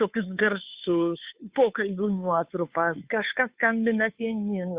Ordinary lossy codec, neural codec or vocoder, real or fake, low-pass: Opus, 64 kbps; codec, 16 kHz, 2 kbps, FunCodec, trained on Chinese and English, 25 frames a second; fake; 3.6 kHz